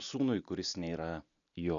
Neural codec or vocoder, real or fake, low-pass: none; real; 7.2 kHz